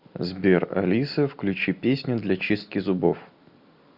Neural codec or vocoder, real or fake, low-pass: none; real; 5.4 kHz